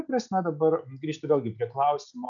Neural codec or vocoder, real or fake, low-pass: none; real; 7.2 kHz